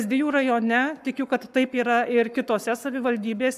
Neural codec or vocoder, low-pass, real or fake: codec, 44.1 kHz, 7.8 kbps, Pupu-Codec; 14.4 kHz; fake